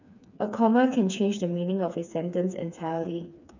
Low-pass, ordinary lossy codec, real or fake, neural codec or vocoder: 7.2 kHz; none; fake; codec, 16 kHz, 4 kbps, FreqCodec, smaller model